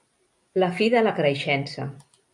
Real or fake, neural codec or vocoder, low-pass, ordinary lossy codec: real; none; 10.8 kHz; AAC, 64 kbps